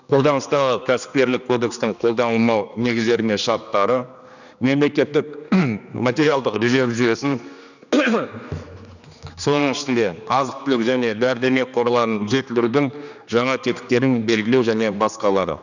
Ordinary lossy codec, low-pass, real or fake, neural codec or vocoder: none; 7.2 kHz; fake; codec, 16 kHz, 2 kbps, X-Codec, HuBERT features, trained on general audio